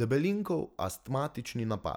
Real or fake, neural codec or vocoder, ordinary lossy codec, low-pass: real; none; none; none